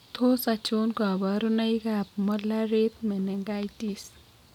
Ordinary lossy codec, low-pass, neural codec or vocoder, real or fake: none; 19.8 kHz; none; real